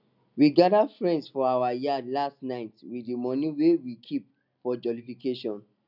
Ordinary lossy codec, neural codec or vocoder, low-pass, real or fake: none; none; 5.4 kHz; real